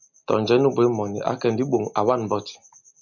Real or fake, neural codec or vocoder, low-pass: real; none; 7.2 kHz